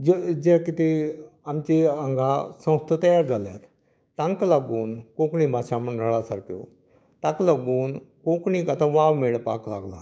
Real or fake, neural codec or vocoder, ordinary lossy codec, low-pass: fake; codec, 16 kHz, 6 kbps, DAC; none; none